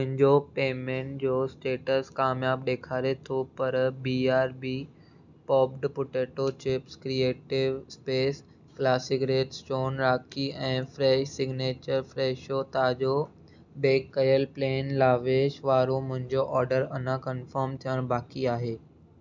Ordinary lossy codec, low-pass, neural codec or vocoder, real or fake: none; 7.2 kHz; none; real